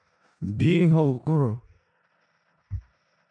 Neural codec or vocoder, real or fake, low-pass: codec, 16 kHz in and 24 kHz out, 0.4 kbps, LongCat-Audio-Codec, four codebook decoder; fake; 9.9 kHz